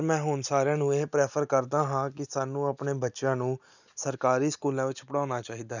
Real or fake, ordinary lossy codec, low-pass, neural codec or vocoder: real; none; 7.2 kHz; none